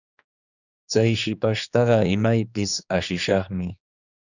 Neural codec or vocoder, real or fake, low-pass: codec, 16 kHz, 2 kbps, X-Codec, HuBERT features, trained on general audio; fake; 7.2 kHz